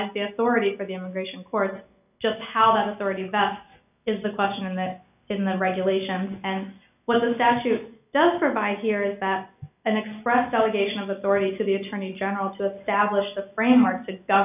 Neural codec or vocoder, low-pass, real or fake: none; 3.6 kHz; real